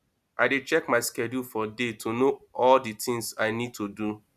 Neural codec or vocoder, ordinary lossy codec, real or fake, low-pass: none; none; real; 14.4 kHz